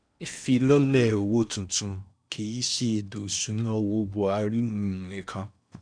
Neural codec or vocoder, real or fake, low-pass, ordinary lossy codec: codec, 16 kHz in and 24 kHz out, 0.8 kbps, FocalCodec, streaming, 65536 codes; fake; 9.9 kHz; none